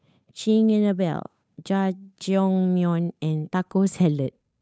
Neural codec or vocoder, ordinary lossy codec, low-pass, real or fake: codec, 16 kHz, 8 kbps, FunCodec, trained on Chinese and English, 25 frames a second; none; none; fake